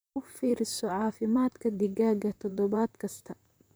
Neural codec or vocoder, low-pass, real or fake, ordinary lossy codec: vocoder, 44.1 kHz, 128 mel bands, Pupu-Vocoder; none; fake; none